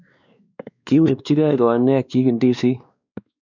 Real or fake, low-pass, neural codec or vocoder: fake; 7.2 kHz; codec, 16 kHz, 2 kbps, X-Codec, WavLM features, trained on Multilingual LibriSpeech